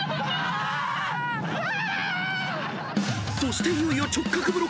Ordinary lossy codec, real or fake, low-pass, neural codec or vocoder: none; real; none; none